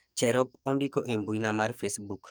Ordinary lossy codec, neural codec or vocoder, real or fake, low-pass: none; codec, 44.1 kHz, 2.6 kbps, SNAC; fake; none